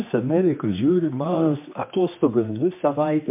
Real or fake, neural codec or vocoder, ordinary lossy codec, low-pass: fake; codec, 16 kHz, 2 kbps, X-Codec, HuBERT features, trained on general audio; MP3, 32 kbps; 3.6 kHz